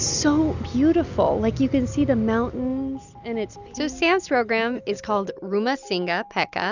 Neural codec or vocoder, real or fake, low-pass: none; real; 7.2 kHz